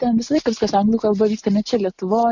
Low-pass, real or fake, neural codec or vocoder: 7.2 kHz; real; none